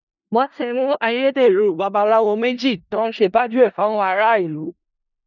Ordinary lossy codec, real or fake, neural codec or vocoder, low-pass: none; fake; codec, 16 kHz in and 24 kHz out, 0.4 kbps, LongCat-Audio-Codec, four codebook decoder; 7.2 kHz